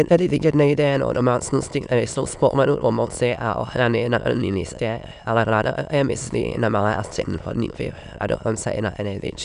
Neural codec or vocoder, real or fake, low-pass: autoencoder, 22.05 kHz, a latent of 192 numbers a frame, VITS, trained on many speakers; fake; 9.9 kHz